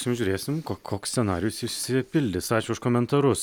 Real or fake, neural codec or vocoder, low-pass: real; none; 19.8 kHz